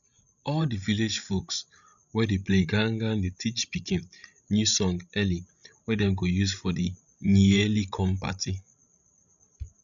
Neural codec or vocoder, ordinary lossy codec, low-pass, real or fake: codec, 16 kHz, 16 kbps, FreqCodec, larger model; none; 7.2 kHz; fake